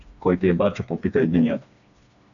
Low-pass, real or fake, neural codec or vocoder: 7.2 kHz; fake; codec, 16 kHz, 2 kbps, FreqCodec, smaller model